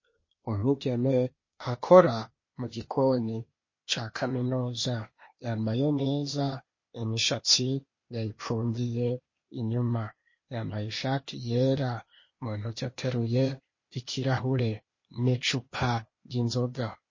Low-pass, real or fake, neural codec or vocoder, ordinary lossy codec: 7.2 kHz; fake; codec, 16 kHz, 0.8 kbps, ZipCodec; MP3, 32 kbps